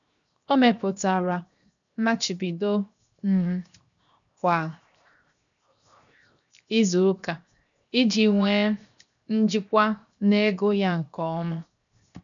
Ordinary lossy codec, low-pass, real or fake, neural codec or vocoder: none; 7.2 kHz; fake; codec, 16 kHz, 0.7 kbps, FocalCodec